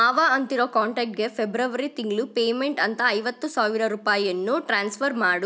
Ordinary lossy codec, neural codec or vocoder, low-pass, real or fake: none; none; none; real